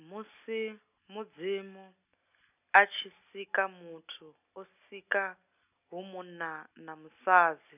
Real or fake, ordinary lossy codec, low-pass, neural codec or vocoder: real; none; 3.6 kHz; none